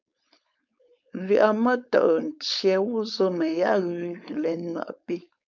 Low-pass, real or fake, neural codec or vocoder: 7.2 kHz; fake; codec, 16 kHz, 4.8 kbps, FACodec